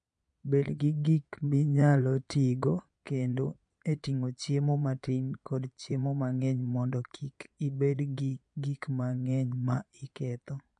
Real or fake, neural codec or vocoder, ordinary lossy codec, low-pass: fake; vocoder, 22.05 kHz, 80 mel bands, Vocos; MP3, 64 kbps; 9.9 kHz